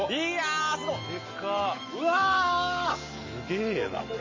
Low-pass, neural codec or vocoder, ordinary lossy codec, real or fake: 7.2 kHz; none; MP3, 32 kbps; real